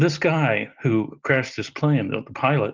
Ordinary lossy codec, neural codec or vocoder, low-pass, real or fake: Opus, 24 kbps; none; 7.2 kHz; real